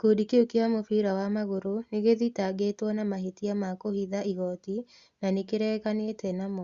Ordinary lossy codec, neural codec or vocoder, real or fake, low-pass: Opus, 64 kbps; none; real; 7.2 kHz